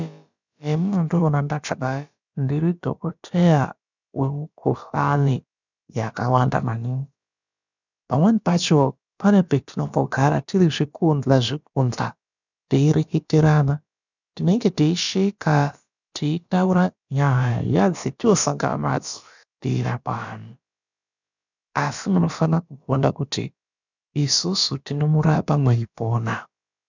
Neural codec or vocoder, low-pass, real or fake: codec, 16 kHz, about 1 kbps, DyCAST, with the encoder's durations; 7.2 kHz; fake